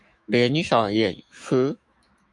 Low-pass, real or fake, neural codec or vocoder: 10.8 kHz; fake; autoencoder, 48 kHz, 128 numbers a frame, DAC-VAE, trained on Japanese speech